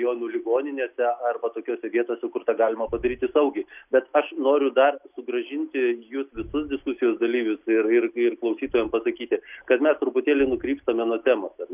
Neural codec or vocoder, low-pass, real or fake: none; 3.6 kHz; real